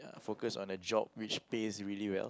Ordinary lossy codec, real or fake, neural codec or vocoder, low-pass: none; real; none; none